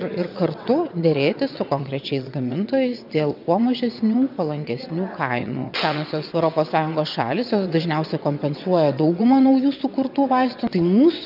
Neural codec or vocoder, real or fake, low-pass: vocoder, 22.05 kHz, 80 mel bands, WaveNeXt; fake; 5.4 kHz